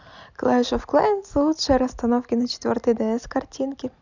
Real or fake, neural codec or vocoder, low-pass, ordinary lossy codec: real; none; 7.2 kHz; none